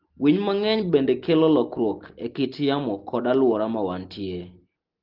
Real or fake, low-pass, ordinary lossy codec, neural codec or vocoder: real; 5.4 kHz; Opus, 16 kbps; none